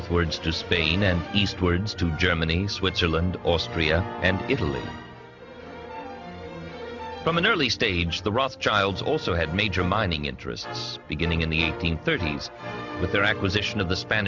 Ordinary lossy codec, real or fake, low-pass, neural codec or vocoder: Opus, 64 kbps; real; 7.2 kHz; none